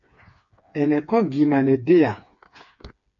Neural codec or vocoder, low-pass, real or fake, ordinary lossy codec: codec, 16 kHz, 4 kbps, FreqCodec, smaller model; 7.2 kHz; fake; MP3, 48 kbps